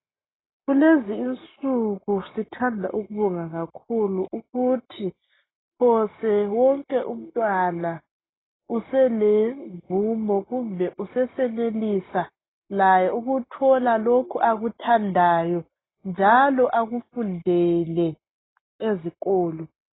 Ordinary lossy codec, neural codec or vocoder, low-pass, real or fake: AAC, 16 kbps; none; 7.2 kHz; real